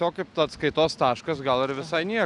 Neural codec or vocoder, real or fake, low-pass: none; real; 10.8 kHz